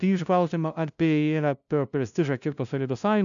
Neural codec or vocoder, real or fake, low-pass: codec, 16 kHz, 0.5 kbps, FunCodec, trained on LibriTTS, 25 frames a second; fake; 7.2 kHz